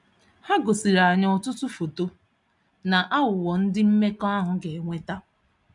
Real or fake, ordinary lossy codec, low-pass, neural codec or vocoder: real; none; 10.8 kHz; none